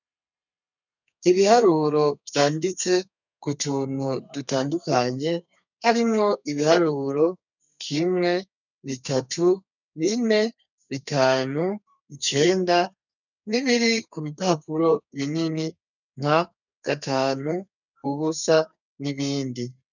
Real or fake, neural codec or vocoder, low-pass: fake; codec, 32 kHz, 1.9 kbps, SNAC; 7.2 kHz